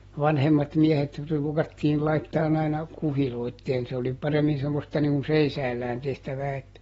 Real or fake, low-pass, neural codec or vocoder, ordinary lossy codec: real; 19.8 kHz; none; AAC, 24 kbps